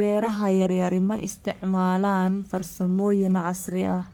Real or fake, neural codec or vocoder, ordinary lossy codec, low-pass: fake; codec, 44.1 kHz, 1.7 kbps, Pupu-Codec; none; none